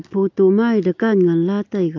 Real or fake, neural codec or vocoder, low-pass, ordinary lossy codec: real; none; 7.2 kHz; AAC, 48 kbps